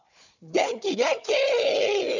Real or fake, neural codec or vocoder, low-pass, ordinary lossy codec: fake; codec, 16 kHz, 4 kbps, FunCodec, trained on Chinese and English, 50 frames a second; 7.2 kHz; none